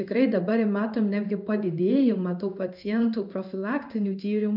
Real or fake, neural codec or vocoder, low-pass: fake; codec, 16 kHz in and 24 kHz out, 1 kbps, XY-Tokenizer; 5.4 kHz